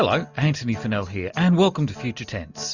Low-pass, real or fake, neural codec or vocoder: 7.2 kHz; real; none